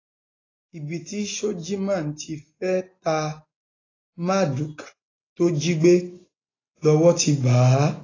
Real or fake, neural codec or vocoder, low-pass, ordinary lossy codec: real; none; 7.2 kHz; AAC, 32 kbps